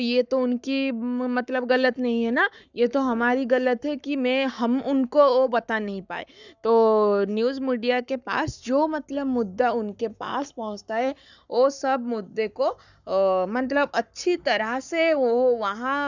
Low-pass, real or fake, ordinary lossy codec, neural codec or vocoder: 7.2 kHz; fake; none; codec, 44.1 kHz, 7.8 kbps, Pupu-Codec